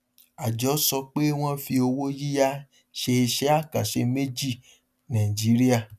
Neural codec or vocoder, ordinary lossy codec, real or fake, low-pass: none; none; real; 14.4 kHz